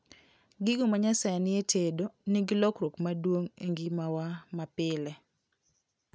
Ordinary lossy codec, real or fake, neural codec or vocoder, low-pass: none; real; none; none